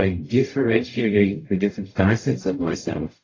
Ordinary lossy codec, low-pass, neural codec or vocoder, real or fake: AAC, 32 kbps; 7.2 kHz; codec, 44.1 kHz, 0.9 kbps, DAC; fake